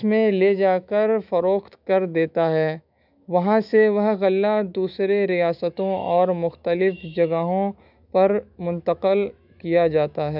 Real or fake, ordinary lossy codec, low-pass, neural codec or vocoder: fake; none; 5.4 kHz; autoencoder, 48 kHz, 128 numbers a frame, DAC-VAE, trained on Japanese speech